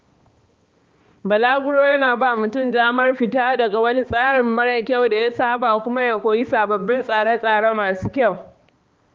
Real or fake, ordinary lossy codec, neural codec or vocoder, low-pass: fake; Opus, 24 kbps; codec, 16 kHz, 2 kbps, X-Codec, HuBERT features, trained on balanced general audio; 7.2 kHz